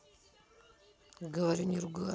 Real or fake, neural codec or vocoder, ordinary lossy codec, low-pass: real; none; none; none